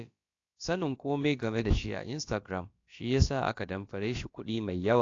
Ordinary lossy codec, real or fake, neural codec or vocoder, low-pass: AAC, 48 kbps; fake; codec, 16 kHz, about 1 kbps, DyCAST, with the encoder's durations; 7.2 kHz